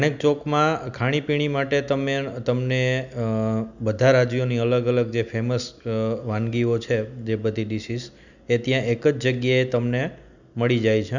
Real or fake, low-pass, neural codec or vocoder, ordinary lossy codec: real; 7.2 kHz; none; none